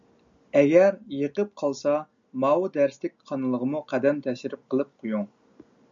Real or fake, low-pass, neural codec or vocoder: real; 7.2 kHz; none